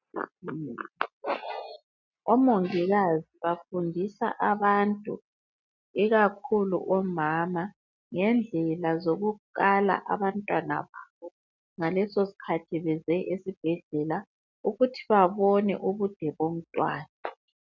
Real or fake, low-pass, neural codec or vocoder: real; 7.2 kHz; none